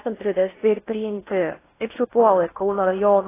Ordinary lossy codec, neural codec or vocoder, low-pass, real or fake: AAC, 16 kbps; codec, 16 kHz in and 24 kHz out, 0.6 kbps, FocalCodec, streaming, 4096 codes; 3.6 kHz; fake